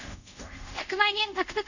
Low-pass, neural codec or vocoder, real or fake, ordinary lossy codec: 7.2 kHz; codec, 24 kHz, 0.5 kbps, DualCodec; fake; none